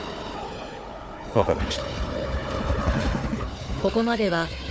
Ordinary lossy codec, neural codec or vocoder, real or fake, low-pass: none; codec, 16 kHz, 4 kbps, FunCodec, trained on Chinese and English, 50 frames a second; fake; none